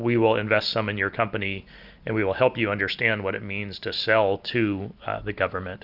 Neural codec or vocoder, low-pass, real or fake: none; 5.4 kHz; real